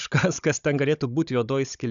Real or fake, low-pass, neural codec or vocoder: fake; 7.2 kHz; codec, 16 kHz, 16 kbps, FunCodec, trained on Chinese and English, 50 frames a second